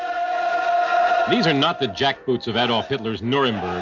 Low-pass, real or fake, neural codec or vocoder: 7.2 kHz; real; none